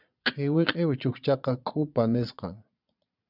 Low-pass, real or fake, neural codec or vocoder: 5.4 kHz; fake; vocoder, 22.05 kHz, 80 mel bands, Vocos